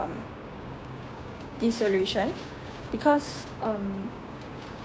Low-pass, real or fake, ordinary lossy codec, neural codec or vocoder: none; fake; none; codec, 16 kHz, 6 kbps, DAC